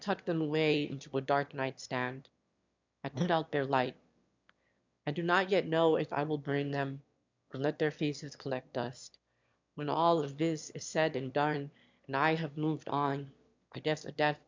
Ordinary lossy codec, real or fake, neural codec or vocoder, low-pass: MP3, 64 kbps; fake; autoencoder, 22.05 kHz, a latent of 192 numbers a frame, VITS, trained on one speaker; 7.2 kHz